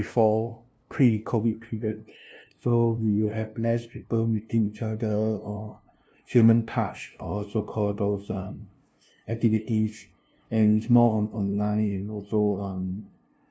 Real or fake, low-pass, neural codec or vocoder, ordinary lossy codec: fake; none; codec, 16 kHz, 0.5 kbps, FunCodec, trained on LibriTTS, 25 frames a second; none